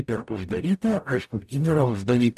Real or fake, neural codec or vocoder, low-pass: fake; codec, 44.1 kHz, 0.9 kbps, DAC; 14.4 kHz